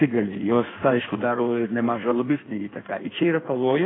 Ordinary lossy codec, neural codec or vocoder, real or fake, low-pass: AAC, 16 kbps; codec, 16 kHz in and 24 kHz out, 1.1 kbps, FireRedTTS-2 codec; fake; 7.2 kHz